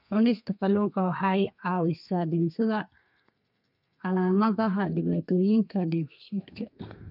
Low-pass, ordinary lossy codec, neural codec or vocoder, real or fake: 5.4 kHz; none; codec, 32 kHz, 1.9 kbps, SNAC; fake